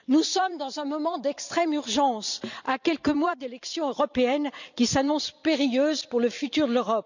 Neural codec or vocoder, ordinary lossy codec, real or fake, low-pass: vocoder, 44.1 kHz, 128 mel bands every 256 samples, BigVGAN v2; none; fake; 7.2 kHz